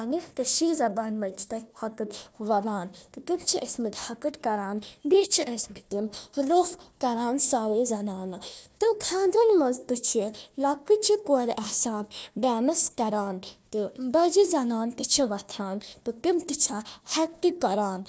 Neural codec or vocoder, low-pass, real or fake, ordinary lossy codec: codec, 16 kHz, 1 kbps, FunCodec, trained on Chinese and English, 50 frames a second; none; fake; none